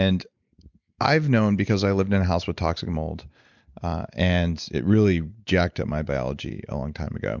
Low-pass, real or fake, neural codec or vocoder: 7.2 kHz; real; none